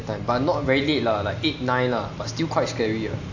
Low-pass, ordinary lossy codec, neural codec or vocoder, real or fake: 7.2 kHz; AAC, 48 kbps; none; real